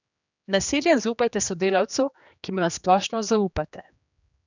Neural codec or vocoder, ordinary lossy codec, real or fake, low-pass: codec, 16 kHz, 2 kbps, X-Codec, HuBERT features, trained on general audio; none; fake; 7.2 kHz